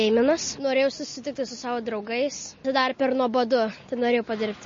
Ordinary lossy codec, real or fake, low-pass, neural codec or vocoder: MP3, 32 kbps; real; 7.2 kHz; none